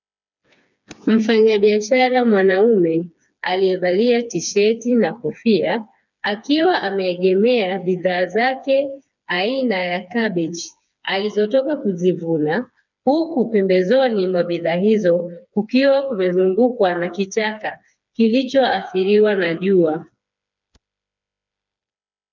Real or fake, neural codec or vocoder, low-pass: fake; codec, 16 kHz, 4 kbps, FreqCodec, smaller model; 7.2 kHz